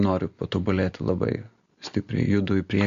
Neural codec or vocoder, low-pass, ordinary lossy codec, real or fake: none; 7.2 kHz; MP3, 48 kbps; real